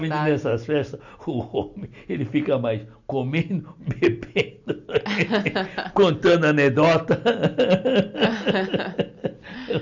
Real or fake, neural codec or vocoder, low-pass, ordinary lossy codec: real; none; 7.2 kHz; none